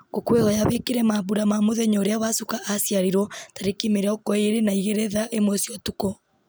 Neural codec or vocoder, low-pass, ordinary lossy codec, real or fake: none; none; none; real